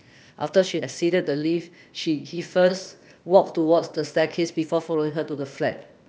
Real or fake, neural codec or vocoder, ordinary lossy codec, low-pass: fake; codec, 16 kHz, 0.8 kbps, ZipCodec; none; none